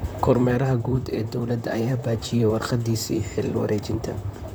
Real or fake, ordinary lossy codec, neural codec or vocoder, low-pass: fake; none; vocoder, 44.1 kHz, 128 mel bands, Pupu-Vocoder; none